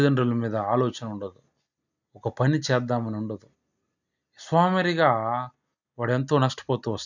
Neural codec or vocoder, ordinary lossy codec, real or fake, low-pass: none; none; real; 7.2 kHz